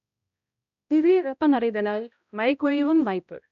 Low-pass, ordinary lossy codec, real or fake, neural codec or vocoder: 7.2 kHz; MP3, 64 kbps; fake; codec, 16 kHz, 0.5 kbps, X-Codec, HuBERT features, trained on balanced general audio